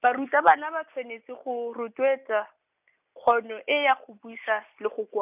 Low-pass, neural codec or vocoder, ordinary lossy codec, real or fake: 3.6 kHz; none; none; real